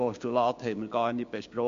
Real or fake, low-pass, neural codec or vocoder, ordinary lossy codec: fake; 7.2 kHz; codec, 16 kHz, 6 kbps, DAC; MP3, 48 kbps